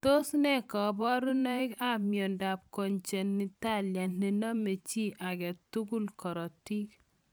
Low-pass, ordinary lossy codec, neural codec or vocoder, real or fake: none; none; vocoder, 44.1 kHz, 128 mel bands every 512 samples, BigVGAN v2; fake